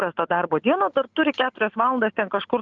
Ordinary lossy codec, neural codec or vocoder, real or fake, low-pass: Opus, 24 kbps; none; real; 7.2 kHz